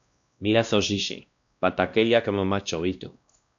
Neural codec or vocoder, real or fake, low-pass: codec, 16 kHz, 1 kbps, X-Codec, WavLM features, trained on Multilingual LibriSpeech; fake; 7.2 kHz